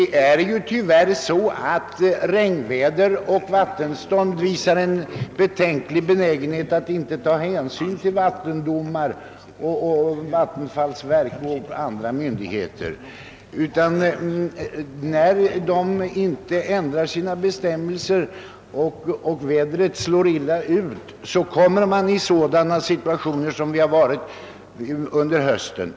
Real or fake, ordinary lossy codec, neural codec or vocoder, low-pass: real; none; none; none